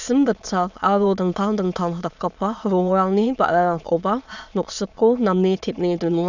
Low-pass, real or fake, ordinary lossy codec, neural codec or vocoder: 7.2 kHz; fake; none; autoencoder, 22.05 kHz, a latent of 192 numbers a frame, VITS, trained on many speakers